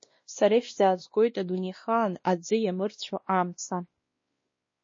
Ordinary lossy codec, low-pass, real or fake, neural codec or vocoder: MP3, 32 kbps; 7.2 kHz; fake; codec, 16 kHz, 1 kbps, X-Codec, WavLM features, trained on Multilingual LibriSpeech